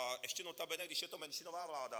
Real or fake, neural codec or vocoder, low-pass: fake; vocoder, 44.1 kHz, 128 mel bands every 512 samples, BigVGAN v2; 19.8 kHz